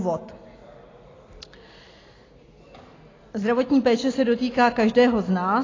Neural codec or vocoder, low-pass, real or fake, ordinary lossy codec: none; 7.2 kHz; real; AAC, 32 kbps